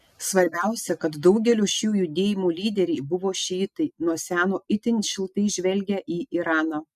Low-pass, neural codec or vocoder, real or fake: 14.4 kHz; none; real